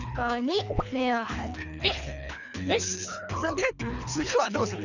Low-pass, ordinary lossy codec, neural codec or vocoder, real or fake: 7.2 kHz; none; codec, 24 kHz, 3 kbps, HILCodec; fake